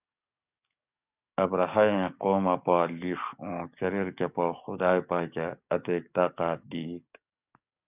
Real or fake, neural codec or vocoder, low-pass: fake; codec, 44.1 kHz, 7.8 kbps, DAC; 3.6 kHz